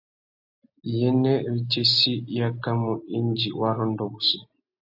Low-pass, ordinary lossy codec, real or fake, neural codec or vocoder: 5.4 kHz; AAC, 48 kbps; real; none